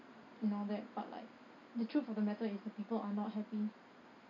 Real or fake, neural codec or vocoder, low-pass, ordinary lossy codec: real; none; 7.2 kHz; none